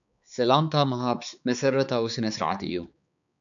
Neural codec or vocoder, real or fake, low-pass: codec, 16 kHz, 4 kbps, X-Codec, HuBERT features, trained on balanced general audio; fake; 7.2 kHz